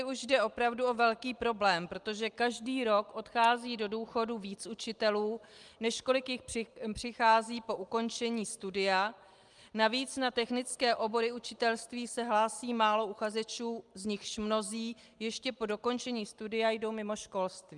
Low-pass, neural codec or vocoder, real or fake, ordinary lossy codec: 10.8 kHz; none; real; Opus, 32 kbps